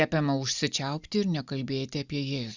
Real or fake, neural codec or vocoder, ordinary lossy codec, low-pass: real; none; Opus, 64 kbps; 7.2 kHz